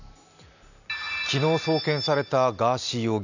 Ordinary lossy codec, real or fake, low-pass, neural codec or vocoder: none; real; 7.2 kHz; none